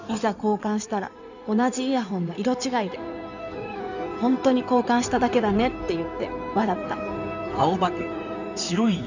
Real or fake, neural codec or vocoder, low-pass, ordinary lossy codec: fake; codec, 16 kHz in and 24 kHz out, 2.2 kbps, FireRedTTS-2 codec; 7.2 kHz; none